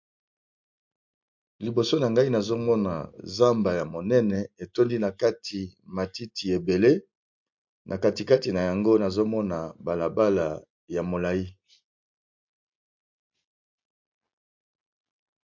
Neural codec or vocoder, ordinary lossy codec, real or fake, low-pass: none; MP3, 48 kbps; real; 7.2 kHz